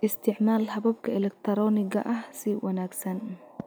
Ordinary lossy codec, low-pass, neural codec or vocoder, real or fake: none; none; none; real